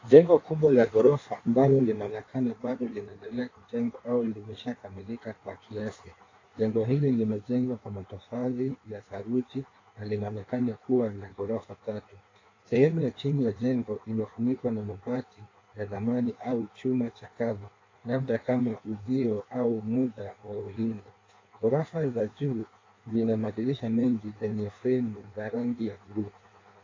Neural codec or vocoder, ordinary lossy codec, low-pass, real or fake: codec, 16 kHz in and 24 kHz out, 1.1 kbps, FireRedTTS-2 codec; AAC, 32 kbps; 7.2 kHz; fake